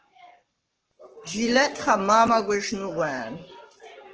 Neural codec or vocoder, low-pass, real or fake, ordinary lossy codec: codec, 44.1 kHz, 7.8 kbps, Pupu-Codec; 7.2 kHz; fake; Opus, 16 kbps